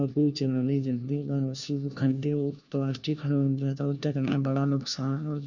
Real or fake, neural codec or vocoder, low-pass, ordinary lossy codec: fake; codec, 16 kHz, 1 kbps, FunCodec, trained on LibriTTS, 50 frames a second; 7.2 kHz; none